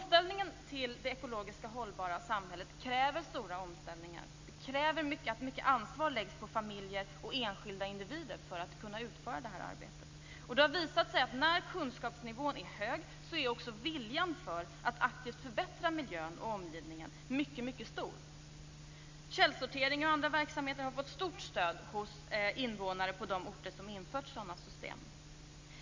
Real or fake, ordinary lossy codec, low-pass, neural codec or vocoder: real; MP3, 64 kbps; 7.2 kHz; none